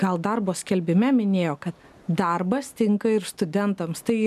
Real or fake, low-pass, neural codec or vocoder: real; 14.4 kHz; none